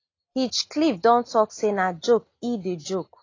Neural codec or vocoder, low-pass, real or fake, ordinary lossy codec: none; 7.2 kHz; real; AAC, 32 kbps